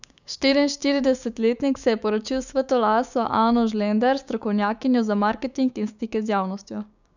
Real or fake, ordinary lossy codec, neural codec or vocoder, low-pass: fake; none; codec, 16 kHz, 6 kbps, DAC; 7.2 kHz